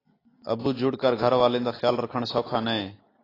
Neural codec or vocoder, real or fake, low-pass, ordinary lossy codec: none; real; 5.4 kHz; AAC, 24 kbps